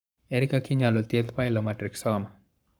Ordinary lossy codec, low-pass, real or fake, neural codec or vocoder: none; none; fake; codec, 44.1 kHz, 7.8 kbps, Pupu-Codec